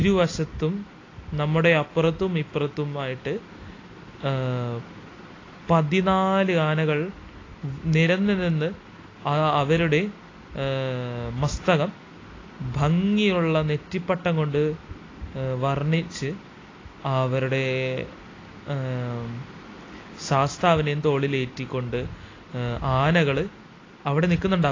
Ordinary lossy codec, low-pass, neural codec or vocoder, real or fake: AAC, 32 kbps; 7.2 kHz; none; real